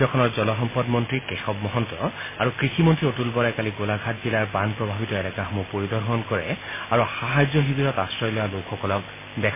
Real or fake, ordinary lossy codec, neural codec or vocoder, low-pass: real; MP3, 16 kbps; none; 3.6 kHz